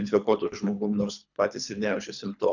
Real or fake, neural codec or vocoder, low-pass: fake; codec, 24 kHz, 3 kbps, HILCodec; 7.2 kHz